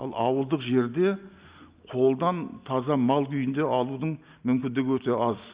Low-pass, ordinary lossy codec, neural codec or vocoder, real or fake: 3.6 kHz; Opus, 64 kbps; none; real